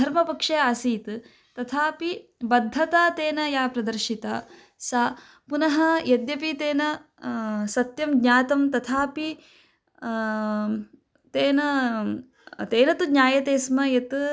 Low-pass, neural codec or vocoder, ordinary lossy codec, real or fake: none; none; none; real